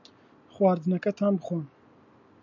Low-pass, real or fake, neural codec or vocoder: 7.2 kHz; real; none